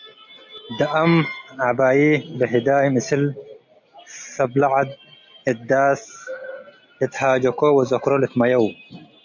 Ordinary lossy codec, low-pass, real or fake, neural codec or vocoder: MP3, 64 kbps; 7.2 kHz; real; none